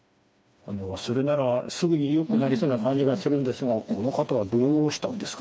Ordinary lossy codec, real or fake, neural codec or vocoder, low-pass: none; fake; codec, 16 kHz, 2 kbps, FreqCodec, smaller model; none